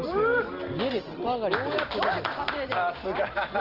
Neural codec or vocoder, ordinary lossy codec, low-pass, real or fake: none; Opus, 16 kbps; 5.4 kHz; real